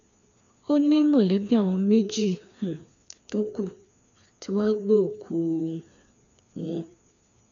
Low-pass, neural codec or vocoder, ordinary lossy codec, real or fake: 7.2 kHz; codec, 16 kHz, 2 kbps, FreqCodec, larger model; none; fake